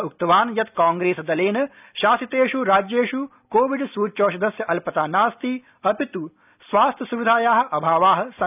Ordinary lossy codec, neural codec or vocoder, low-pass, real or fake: none; none; 3.6 kHz; real